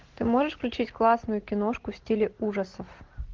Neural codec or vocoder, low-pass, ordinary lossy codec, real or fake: none; 7.2 kHz; Opus, 16 kbps; real